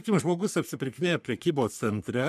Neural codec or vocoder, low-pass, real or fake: codec, 44.1 kHz, 3.4 kbps, Pupu-Codec; 14.4 kHz; fake